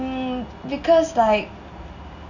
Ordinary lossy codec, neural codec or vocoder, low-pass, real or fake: none; none; 7.2 kHz; real